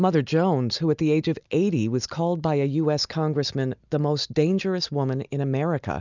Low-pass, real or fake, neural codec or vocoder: 7.2 kHz; real; none